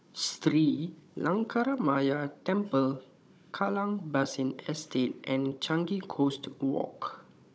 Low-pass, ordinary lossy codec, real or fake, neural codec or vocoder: none; none; fake; codec, 16 kHz, 16 kbps, FunCodec, trained on Chinese and English, 50 frames a second